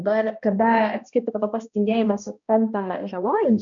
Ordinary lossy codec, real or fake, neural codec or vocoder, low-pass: MP3, 64 kbps; fake; codec, 16 kHz, 1 kbps, X-Codec, HuBERT features, trained on balanced general audio; 7.2 kHz